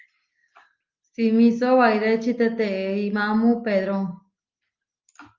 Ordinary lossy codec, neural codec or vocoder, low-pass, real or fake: Opus, 32 kbps; none; 7.2 kHz; real